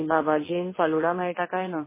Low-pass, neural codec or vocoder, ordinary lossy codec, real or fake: 3.6 kHz; vocoder, 22.05 kHz, 80 mel bands, WaveNeXt; MP3, 16 kbps; fake